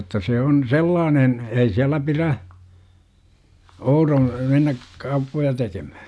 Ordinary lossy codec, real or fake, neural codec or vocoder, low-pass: none; real; none; none